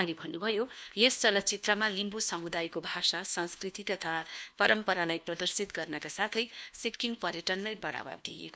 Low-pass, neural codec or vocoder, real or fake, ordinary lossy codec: none; codec, 16 kHz, 1 kbps, FunCodec, trained on LibriTTS, 50 frames a second; fake; none